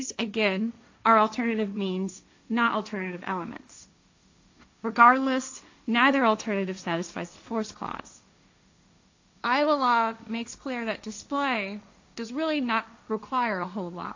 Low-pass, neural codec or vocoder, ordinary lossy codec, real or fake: 7.2 kHz; codec, 16 kHz, 1.1 kbps, Voila-Tokenizer; AAC, 48 kbps; fake